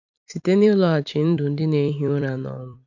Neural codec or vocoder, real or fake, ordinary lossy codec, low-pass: vocoder, 44.1 kHz, 128 mel bands every 256 samples, BigVGAN v2; fake; none; 7.2 kHz